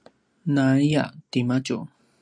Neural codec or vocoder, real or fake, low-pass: none; real; 9.9 kHz